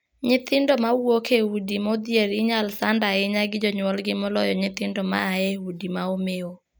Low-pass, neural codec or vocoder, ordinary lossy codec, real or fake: none; none; none; real